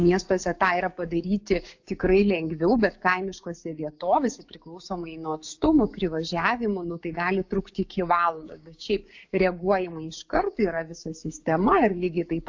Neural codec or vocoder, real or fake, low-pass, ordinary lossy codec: codec, 16 kHz, 6 kbps, DAC; fake; 7.2 kHz; AAC, 48 kbps